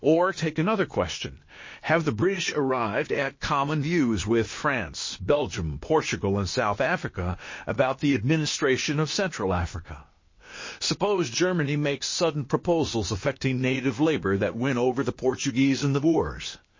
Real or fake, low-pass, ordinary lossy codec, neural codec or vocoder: fake; 7.2 kHz; MP3, 32 kbps; codec, 16 kHz, 0.8 kbps, ZipCodec